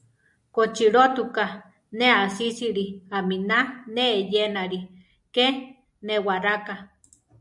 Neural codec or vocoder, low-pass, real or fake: none; 10.8 kHz; real